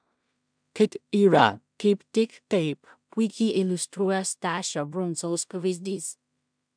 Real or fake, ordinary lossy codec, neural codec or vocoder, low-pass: fake; MP3, 96 kbps; codec, 16 kHz in and 24 kHz out, 0.4 kbps, LongCat-Audio-Codec, two codebook decoder; 9.9 kHz